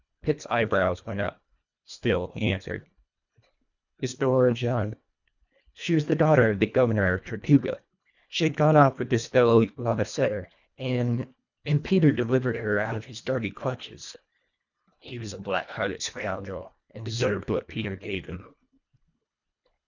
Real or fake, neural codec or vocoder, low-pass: fake; codec, 24 kHz, 1.5 kbps, HILCodec; 7.2 kHz